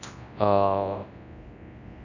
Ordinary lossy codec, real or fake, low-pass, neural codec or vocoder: none; fake; 7.2 kHz; codec, 24 kHz, 0.9 kbps, WavTokenizer, large speech release